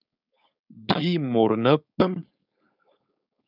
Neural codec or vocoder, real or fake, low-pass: codec, 16 kHz, 4.8 kbps, FACodec; fake; 5.4 kHz